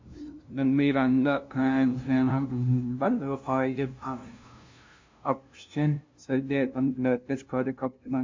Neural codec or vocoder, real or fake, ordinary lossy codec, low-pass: codec, 16 kHz, 0.5 kbps, FunCodec, trained on LibriTTS, 25 frames a second; fake; MP3, 48 kbps; 7.2 kHz